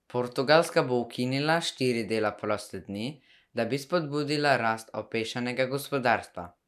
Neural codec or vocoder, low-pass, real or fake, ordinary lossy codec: none; 14.4 kHz; real; none